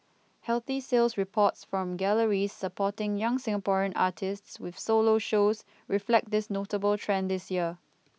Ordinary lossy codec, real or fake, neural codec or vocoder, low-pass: none; real; none; none